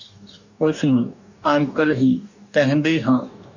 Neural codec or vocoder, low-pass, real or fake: codec, 44.1 kHz, 2.6 kbps, DAC; 7.2 kHz; fake